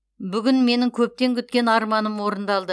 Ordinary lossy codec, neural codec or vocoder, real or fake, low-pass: none; none; real; none